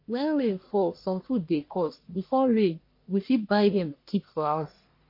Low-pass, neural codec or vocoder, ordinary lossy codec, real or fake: 5.4 kHz; codec, 44.1 kHz, 1.7 kbps, Pupu-Codec; MP3, 32 kbps; fake